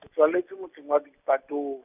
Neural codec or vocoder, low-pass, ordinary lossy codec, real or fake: none; 3.6 kHz; none; real